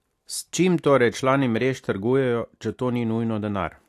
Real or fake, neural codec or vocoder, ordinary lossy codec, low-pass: real; none; AAC, 64 kbps; 14.4 kHz